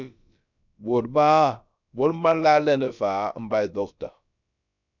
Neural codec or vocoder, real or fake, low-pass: codec, 16 kHz, about 1 kbps, DyCAST, with the encoder's durations; fake; 7.2 kHz